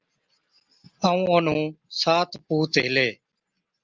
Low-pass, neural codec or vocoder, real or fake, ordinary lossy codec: 7.2 kHz; none; real; Opus, 24 kbps